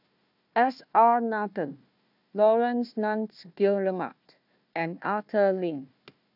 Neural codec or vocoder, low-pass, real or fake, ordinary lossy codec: codec, 16 kHz, 1 kbps, FunCodec, trained on Chinese and English, 50 frames a second; 5.4 kHz; fake; none